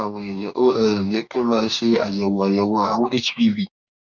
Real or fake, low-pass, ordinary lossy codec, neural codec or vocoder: fake; 7.2 kHz; none; codec, 44.1 kHz, 2.6 kbps, DAC